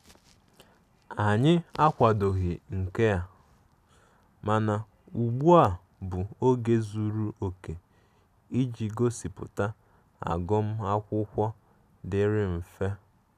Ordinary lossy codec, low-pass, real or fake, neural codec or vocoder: none; 14.4 kHz; real; none